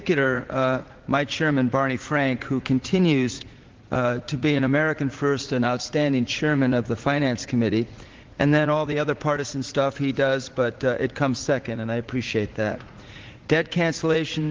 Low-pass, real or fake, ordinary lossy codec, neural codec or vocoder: 7.2 kHz; fake; Opus, 16 kbps; vocoder, 44.1 kHz, 80 mel bands, Vocos